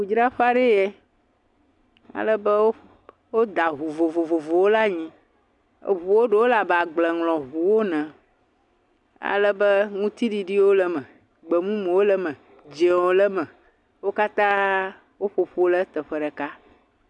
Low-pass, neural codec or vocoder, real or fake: 10.8 kHz; none; real